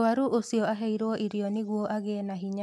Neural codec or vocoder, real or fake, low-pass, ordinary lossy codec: none; real; 14.4 kHz; MP3, 96 kbps